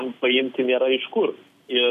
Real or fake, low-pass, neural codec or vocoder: real; 14.4 kHz; none